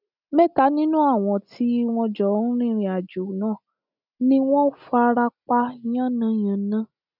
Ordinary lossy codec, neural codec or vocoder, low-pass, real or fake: none; none; 5.4 kHz; real